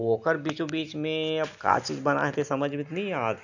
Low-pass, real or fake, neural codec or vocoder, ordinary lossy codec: 7.2 kHz; real; none; none